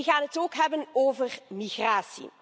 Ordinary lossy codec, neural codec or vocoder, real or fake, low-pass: none; none; real; none